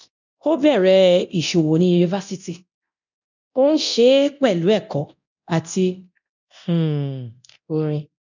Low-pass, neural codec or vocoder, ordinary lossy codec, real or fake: 7.2 kHz; codec, 24 kHz, 0.9 kbps, DualCodec; none; fake